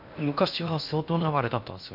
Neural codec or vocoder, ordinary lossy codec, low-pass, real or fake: codec, 16 kHz in and 24 kHz out, 0.6 kbps, FocalCodec, streaming, 4096 codes; none; 5.4 kHz; fake